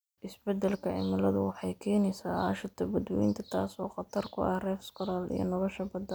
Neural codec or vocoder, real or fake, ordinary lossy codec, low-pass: none; real; none; none